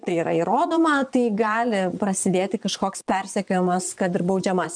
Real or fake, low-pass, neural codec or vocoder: fake; 9.9 kHz; vocoder, 22.05 kHz, 80 mel bands, Vocos